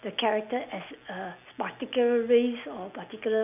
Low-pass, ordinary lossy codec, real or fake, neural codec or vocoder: 3.6 kHz; none; real; none